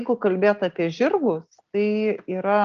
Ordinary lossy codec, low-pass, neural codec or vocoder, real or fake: Opus, 24 kbps; 7.2 kHz; none; real